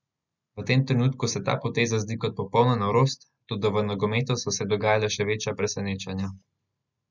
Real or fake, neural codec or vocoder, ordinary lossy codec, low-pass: real; none; none; 7.2 kHz